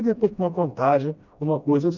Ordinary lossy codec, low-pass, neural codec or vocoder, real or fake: none; 7.2 kHz; codec, 16 kHz, 1 kbps, FreqCodec, smaller model; fake